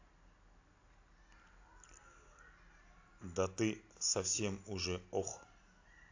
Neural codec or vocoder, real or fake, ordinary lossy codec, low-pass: none; real; AAC, 48 kbps; 7.2 kHz